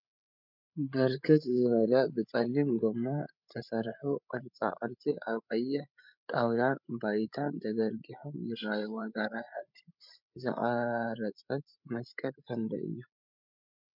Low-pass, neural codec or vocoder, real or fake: 5.4 kHz; codec, 16 kHz, 8 kbps, FreqCodec, larger model; fake